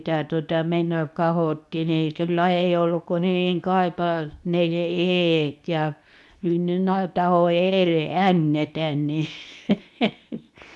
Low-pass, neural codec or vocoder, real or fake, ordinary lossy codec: none; codec, 24 kHz, 0.9 kbps, WavTokenizer, medium speech release version 2; fake; none